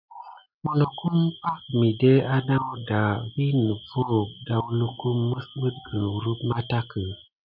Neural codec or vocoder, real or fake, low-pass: none; real; 5.4 kHz